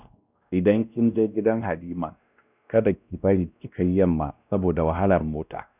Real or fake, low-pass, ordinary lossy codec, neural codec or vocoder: fake; 3.6 kHz; none; codec, 16 kHz, 1 kbps, X-Codec, WavLM features, trained on Multilingual LibriSpeech